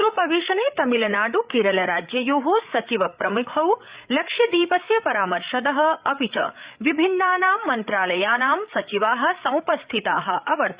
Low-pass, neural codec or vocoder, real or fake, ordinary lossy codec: 3.6 kHz; codec, 16 kHz, 8 kbps, FreqCodec, larger model; fake; Opus, 64 kbps